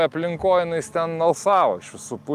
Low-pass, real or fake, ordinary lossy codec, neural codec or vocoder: 14.4 kHz; real; Opus, 32 kbps; none